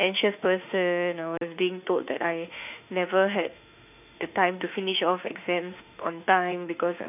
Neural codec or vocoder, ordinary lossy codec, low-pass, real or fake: autoencoder, 48 kHz, 32 numbers a frame, DAC-VAE, trained on Japanese speech; none; 3.6 kHz; fake